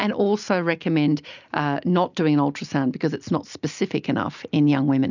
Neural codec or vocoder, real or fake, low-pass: none; real; 7.2 kHz